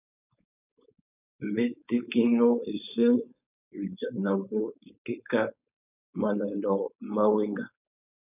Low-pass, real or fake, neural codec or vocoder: 3.6 kHz; fake; codec, 16 kHz, 4.8 kbps, FACodec